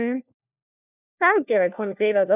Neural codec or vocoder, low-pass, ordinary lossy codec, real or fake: codec, 16 kHz, 1 kbps, FunCodec, trained on LibriTTS, 50 frames a second; 3.6 kHz; none; fake